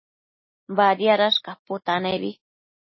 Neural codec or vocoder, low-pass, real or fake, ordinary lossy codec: none; 7.2 kHz; real; MP3, 24 kbps